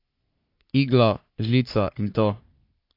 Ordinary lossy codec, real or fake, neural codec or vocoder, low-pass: AAC, 32 kbps; fake; codec, 44.1 kHz, 3.4 kbps, Pupu-Codec; 5.4 kHz